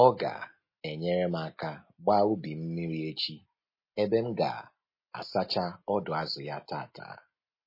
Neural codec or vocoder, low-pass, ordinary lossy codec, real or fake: none; 5.4 kHz; MP3, 24 kbps; real